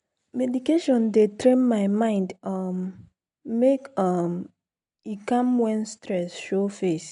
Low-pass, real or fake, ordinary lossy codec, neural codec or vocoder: 10.8 kHz; real; MP3, 64 kbps; none